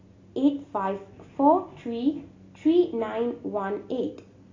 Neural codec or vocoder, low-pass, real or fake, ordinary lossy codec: none; 7.2 kHz; real; AAC, 32 kbps